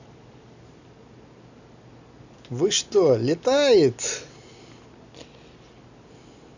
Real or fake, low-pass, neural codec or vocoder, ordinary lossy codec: real; 7.2 kHz; none; none